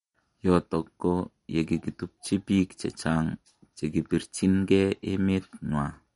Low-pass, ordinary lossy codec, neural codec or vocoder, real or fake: 19.8 kHz; MP3, 48 kbps; none; real